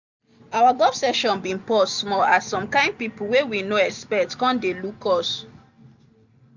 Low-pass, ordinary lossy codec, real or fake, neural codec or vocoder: 7.2 kHz; none; real; none